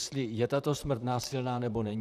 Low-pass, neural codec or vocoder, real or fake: 14.4 kHz; vocoder, 44.1 kHz, 128 mel bands, Pupu-Vocoder; fake